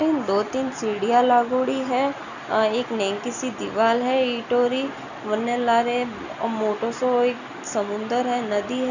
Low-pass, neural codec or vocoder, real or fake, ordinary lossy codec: 7.2 kHz; none; real; none